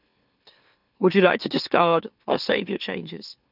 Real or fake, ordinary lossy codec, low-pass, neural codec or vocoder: fake; none; 5.4 kHz; autoencoder, 44.1 kHz, a latent of 192 numbers a frame, MeloTTS